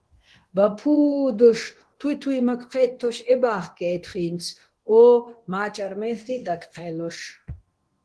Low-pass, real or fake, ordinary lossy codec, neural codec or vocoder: 10.8 kHz; fake; Opus, 16 kbps; codec, 24 kHz, 0.9 kbps, DualCodec